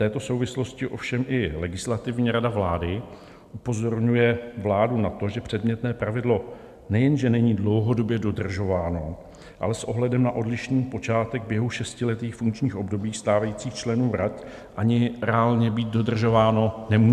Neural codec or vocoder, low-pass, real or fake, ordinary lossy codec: none; 14.4 kHz; real; MP3, 96 kbps